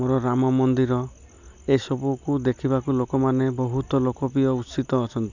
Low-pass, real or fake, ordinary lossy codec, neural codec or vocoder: 7.2 kHz; real; none; none